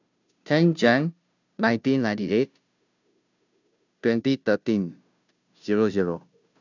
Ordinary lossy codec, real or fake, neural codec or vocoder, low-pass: none; fake; codec, 16 kHz, 0.5 kbps, FunCodec, trained on Chinese and English, 25 frames a second; 7.2 kHz